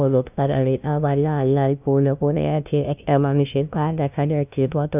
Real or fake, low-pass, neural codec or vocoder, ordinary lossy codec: fake; 3.6 kHz; codec, 16 kHz, 0.5 kbps, FunCodec, trained on LibriTTS, 25 frames a second; none